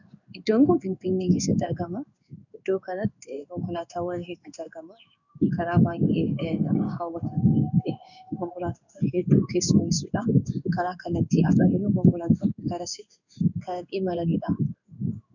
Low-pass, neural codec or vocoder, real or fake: 7.2 kHz; codec, 16 kHz in and 24 kHz out, 1 kbps, XY-Tokenizer; fake